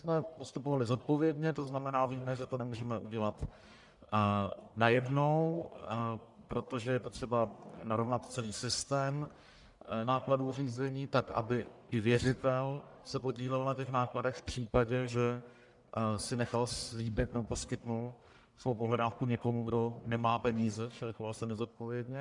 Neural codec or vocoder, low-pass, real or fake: codec, 44.1 kHz, 1.7 kbps, Pupu-Codec; 10.8 kHz; fake